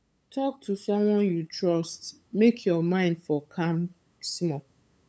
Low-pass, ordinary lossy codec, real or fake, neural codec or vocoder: none; none; fake; codec, 16 kHz, 8 kbps, FunCodec, trained on LibriTTS, 25 frames a second